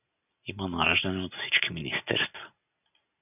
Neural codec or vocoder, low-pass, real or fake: none; 3.6 kHz; real